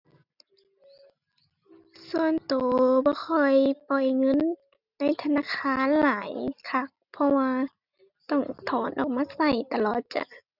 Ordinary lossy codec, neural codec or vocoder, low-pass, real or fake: none; none; 5.4 kHz; real